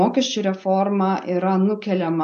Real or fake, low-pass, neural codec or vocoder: real; 9.9 kHz; none